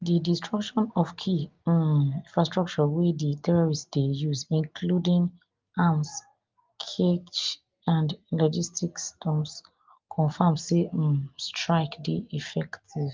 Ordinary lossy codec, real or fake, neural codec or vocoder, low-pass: Opus, 32 kbps; real; none; 7.2 kHz